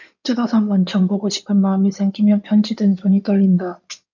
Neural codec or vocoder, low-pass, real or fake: codec, 16 kHz in and 24 kHz out, 2.2 kbps, FireRedTTS-2 codec; 7.2 kHz; fake